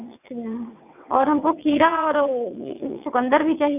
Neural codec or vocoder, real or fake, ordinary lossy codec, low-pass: vocoder, 22.05 kHz, 80 mel bands, WaveNeXt; fake; none; 3.6 kHz